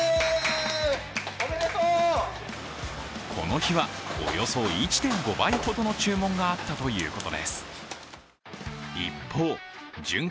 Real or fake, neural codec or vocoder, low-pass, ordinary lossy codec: real; none; none; none